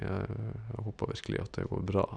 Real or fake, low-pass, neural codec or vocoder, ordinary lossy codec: real; 9.9 kHz; none; none